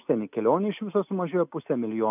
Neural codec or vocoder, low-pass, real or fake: none; 3.6 kHz; real